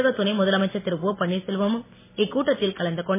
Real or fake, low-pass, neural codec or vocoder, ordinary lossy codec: real; 3.6 kHz; none; MP3, 16 kbps